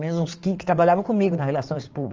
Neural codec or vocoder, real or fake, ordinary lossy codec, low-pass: codec, 16 kHz in and 24 kHz out, 2.2 kbps, FireRedTTS-2 codec; fake; Opus, 32 kbps; 7.2 kHz